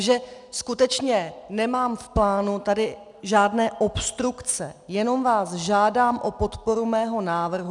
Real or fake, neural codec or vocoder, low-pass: real; none; 10.8 kHz